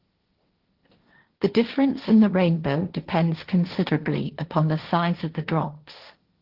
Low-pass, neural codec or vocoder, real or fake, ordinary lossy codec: 5.4 kHz; codec, 16 kHz, 1.1 kbps, Voila-Tokenizer; fake; Opus, 16 kbps